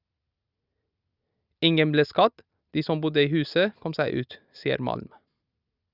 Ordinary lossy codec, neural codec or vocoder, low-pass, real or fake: Opus, 64 kbps; none; 5.4 kHz; real